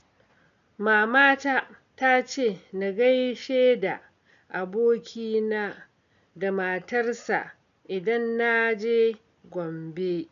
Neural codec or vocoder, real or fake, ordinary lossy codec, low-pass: none; real; none; 7.2 kHz